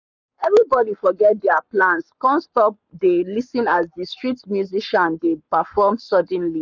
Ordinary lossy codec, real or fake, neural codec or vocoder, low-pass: none; fake; codec, 44.1 kHz, 7.8 kbps, Pupu-Codec; 7.2 kHz